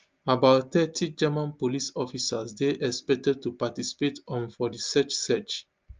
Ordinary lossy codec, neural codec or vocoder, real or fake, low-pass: Opus, 24 kbps; none; real; 7.2 kHz